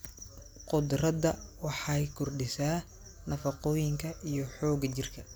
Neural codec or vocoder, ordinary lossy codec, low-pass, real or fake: none; none; none; real